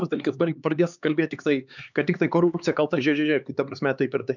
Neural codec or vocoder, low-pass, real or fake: codec, 16 kHz, 4 kbps, X-Codec, HuBERT features, trained on LibriSpeech; 7.2 kHz; fake